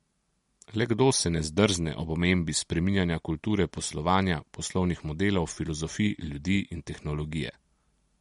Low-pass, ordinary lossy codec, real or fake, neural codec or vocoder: 19.8 kHz; MP3, 48 kbps; real; none